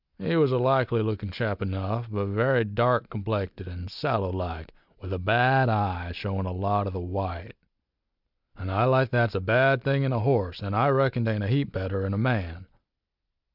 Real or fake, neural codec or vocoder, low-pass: real; none; 5.4 kHz